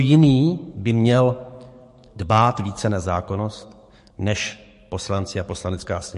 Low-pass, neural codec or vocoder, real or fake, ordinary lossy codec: 14.4 kHz; codec, 44.1 kHz, 7.8 kbps, DAC; fake; MP3, 48 kbps